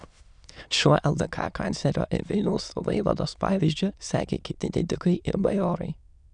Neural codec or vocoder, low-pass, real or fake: autoencoder, 22.05 kHz, a latent of 192 numbers a frame, VITS, trained on many speakers; 9.9 kHz; fake